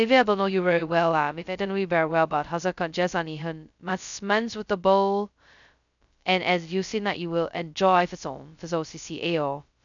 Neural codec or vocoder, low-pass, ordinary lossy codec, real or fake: codec, 16 kHz, 0.2 kbps, FocalCodec; 7.2 kHz; none; fake